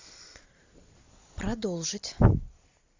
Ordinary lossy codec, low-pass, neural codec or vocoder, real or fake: AAC, 48 kbps; 7.2 kHz; none; real